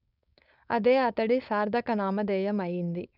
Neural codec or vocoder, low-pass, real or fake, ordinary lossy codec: codec, 16 kHz, 4.8 kbps, FACodec; 5.4 kHz; fake; none